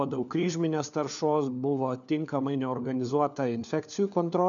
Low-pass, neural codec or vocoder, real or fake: 7.2 kHz; codec, 16 kHz, 4 kbps, FunCodec, trained on LibriTTS, 50 frames a second; fake